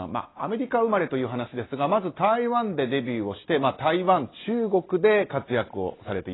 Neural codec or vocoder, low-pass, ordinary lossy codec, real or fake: none; 7.2 kHz; AAC, 16 kbps; real